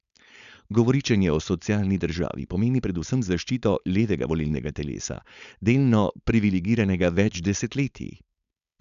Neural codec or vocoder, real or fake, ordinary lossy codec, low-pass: codec, 16 kHz, 4.8 kbps, FACodec; fake; none; 7.2 kHz